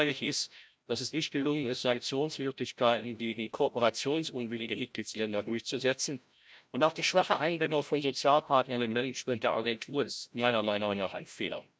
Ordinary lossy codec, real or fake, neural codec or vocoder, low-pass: none; fake; codec, 16 kHz, 0.5 kbps, FreqCodec, larger model; none